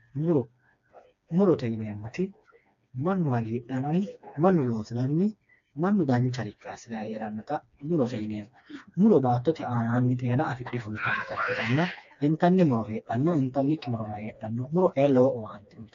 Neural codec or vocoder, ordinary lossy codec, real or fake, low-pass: codec, 16 kHz, 2 kbps, FreqCodec, smaller model; AAC, 64 kbps; fake; 7.2 kHz